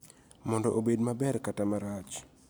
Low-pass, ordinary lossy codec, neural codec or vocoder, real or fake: none; none; none; real